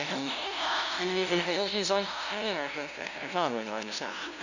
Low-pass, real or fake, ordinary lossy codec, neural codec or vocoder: 7.2 kHz; fake; none; codec, 16 kHz, 0.5 kbps, FunCodec, trained on LibriTTS, 25 frames a second